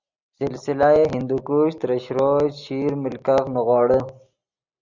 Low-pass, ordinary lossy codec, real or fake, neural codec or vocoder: 7.2 kHz; Opus, 64 kbps; real; none